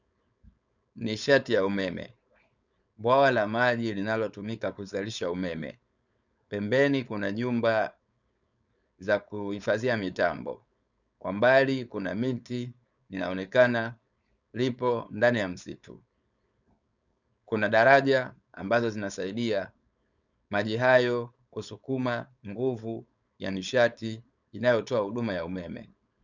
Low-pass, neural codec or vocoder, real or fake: 7.2 kHz; codec, 16 kHz, 4.8 kbps, FACodec; fake